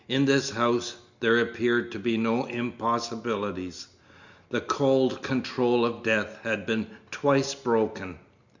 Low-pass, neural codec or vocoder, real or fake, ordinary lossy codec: 7.2 kHz; none; real; Opus, 64 kbps